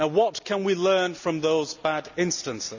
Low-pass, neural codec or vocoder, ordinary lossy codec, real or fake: 7.2 kHz; none; none; real